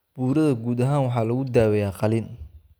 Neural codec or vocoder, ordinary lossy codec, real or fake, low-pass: none; none; real; none